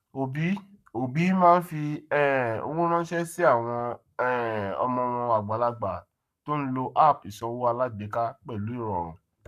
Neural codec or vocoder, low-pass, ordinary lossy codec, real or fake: codec, 44.1 kHz, 7.8 kbps, Pupu-Codec; 14.4 kHz; none; fake